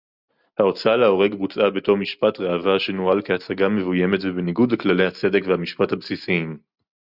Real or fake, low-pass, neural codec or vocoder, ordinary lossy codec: real; 5.4 kHz; none; Opus, 64 kbps